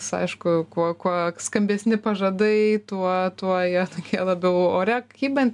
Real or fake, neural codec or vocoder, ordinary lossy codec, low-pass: real; none; MP3, 96 kbps; 10.8 kHz